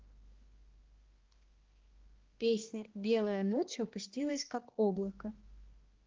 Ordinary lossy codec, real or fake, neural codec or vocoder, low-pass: Opus, 16 kbps; fake; codec, 16 kHz, 1 kbps, X-Codec, HuBERT features, trained on balanced general audio; 7.2 kHz